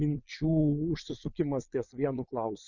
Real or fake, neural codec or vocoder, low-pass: fake; vocoder, 22.05 kHz, 80 mel bands, Vocos; 7.2 kHz